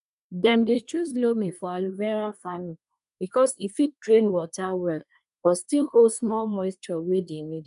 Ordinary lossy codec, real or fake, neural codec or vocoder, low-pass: none; fake; codec, 24 kHz, 1 kbps, SNAC; 10.8 kHz